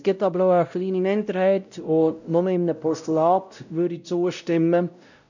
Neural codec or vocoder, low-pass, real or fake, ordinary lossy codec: codec, 16 kHz, 0.5 kbps, X-Codec, WavLM features, trained on Multilingual LibriSpeech; 7.2 kHz; fake; none